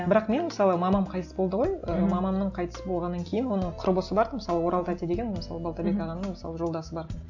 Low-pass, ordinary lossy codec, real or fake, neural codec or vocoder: 7.2 kHz; none; real; none